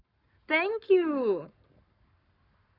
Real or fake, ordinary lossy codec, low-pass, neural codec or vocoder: fake; none; 5.4 kHz; codec, 44.1 kHz, 7.8 kbps, Pupu-Codec